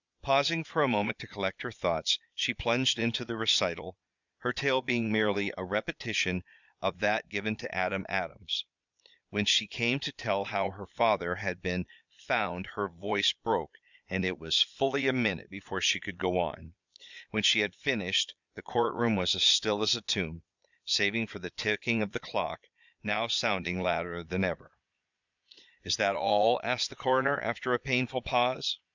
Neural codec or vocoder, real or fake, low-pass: vocoder, 22.05 kHz, 80 mel bands, Vocos; fake; 7.2 kHz